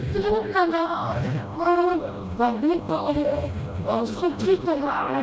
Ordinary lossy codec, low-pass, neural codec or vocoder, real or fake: none; none; codec, 16 kHz, 0.5 kbps, FreqCodec, smaller model; fake